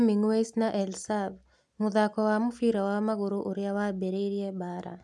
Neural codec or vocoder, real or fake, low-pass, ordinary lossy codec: none; real; none; none